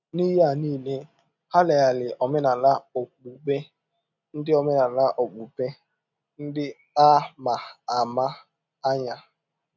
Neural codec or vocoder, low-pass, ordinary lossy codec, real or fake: none; 7.2 kHz; none; real